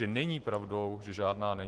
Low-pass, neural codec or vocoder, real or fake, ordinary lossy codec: 10.8 kHz; none; real; Opus, 16 kbps